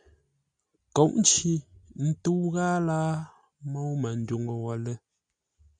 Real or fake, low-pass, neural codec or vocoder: real; 9.9 kHz; none